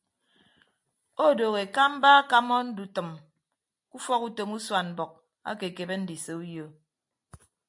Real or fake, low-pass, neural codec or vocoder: real; 10.8 kHz; none